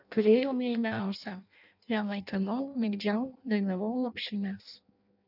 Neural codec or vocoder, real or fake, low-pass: codec, 16 kHz in and 24 kHz out, 0.6 kbps, FireRedTTS-2 codec; fake; 5.4 kHz